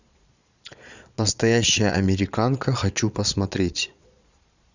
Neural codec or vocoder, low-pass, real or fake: vocoder, 22.05 kHz, 80 mel bands, Vocos; 7.2 kHz; fake